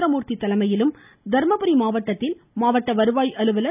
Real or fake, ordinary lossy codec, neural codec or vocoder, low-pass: real; none; none; 3.6 kHz